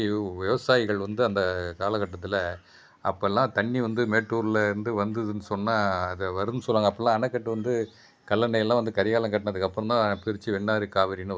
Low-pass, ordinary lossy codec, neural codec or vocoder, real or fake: none; none; none; real